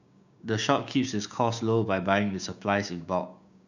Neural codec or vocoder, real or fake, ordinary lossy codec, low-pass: codec, 16 kHz, 6 kbps, DAC; fake; none; 7.2 kHz